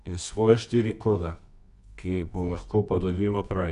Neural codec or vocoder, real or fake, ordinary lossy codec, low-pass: codec, 24 kHz, 0.9 kbps, WavTokenizer, medium music audio release; fake; none; 10.8 kHz